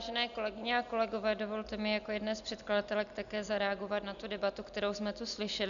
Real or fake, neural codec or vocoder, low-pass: real; none; 7.2 kHz